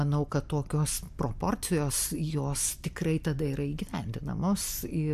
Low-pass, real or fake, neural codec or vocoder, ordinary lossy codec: 14.4 kHz; real; none; AAC, 96 kbps